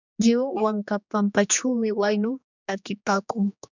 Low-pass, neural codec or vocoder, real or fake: 7.2 kHz; codec, 16 kHz, 2 kbps, X-Codec, HuBERT features, trained on general audio; fake